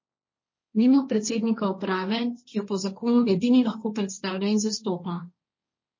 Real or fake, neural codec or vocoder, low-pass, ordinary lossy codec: fake; codec, 16 kHz, 1.1 kbps, Voila-Tokenizer; 7.2 kHz; MP3, 32 kbps